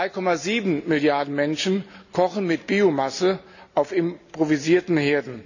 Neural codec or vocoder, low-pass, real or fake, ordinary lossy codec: none; 7.2 kHz; real; none